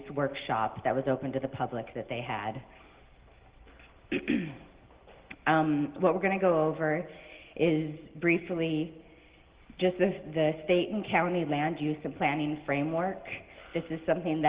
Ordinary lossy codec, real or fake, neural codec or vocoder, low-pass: Opus, 16 kbps; real; none; 3.6 kHz